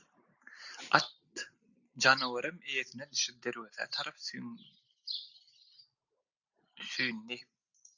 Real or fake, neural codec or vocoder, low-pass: real; none; 7.2 kHz